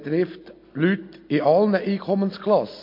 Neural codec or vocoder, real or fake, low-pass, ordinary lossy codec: none; real; 5.4 kHz; MP3, 32 kbps